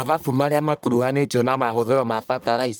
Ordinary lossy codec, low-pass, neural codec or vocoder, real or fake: none; none; codec, 44.1 kHz, 1.7 kbps, Pupu-Codec; fake